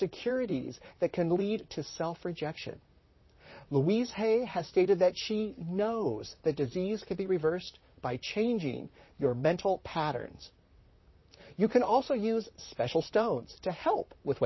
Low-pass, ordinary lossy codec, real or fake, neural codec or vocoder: 7.2 kHz; MP3, 24 kbps; fake; vocoder, 44.1 kHz, 128 mel bands, Pupu-Vocoder